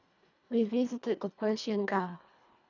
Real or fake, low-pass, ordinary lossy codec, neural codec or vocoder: fake; 7.2 kHz; none; codec, 24 kHz, 1.5 kbps, HILCodec